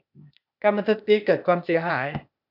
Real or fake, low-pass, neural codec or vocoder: fake; 5.4 kHz; codec, 16 kHz, 0.8 kbps, ZipCodec